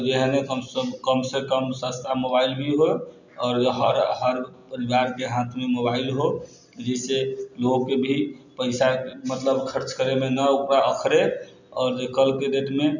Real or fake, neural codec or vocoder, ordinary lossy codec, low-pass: real; none; none; 7.2 kHz